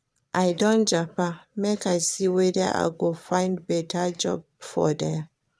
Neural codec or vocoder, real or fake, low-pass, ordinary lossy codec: vocoder, 22.05 kHz, 80 mel bands, Vocos; fake; none; none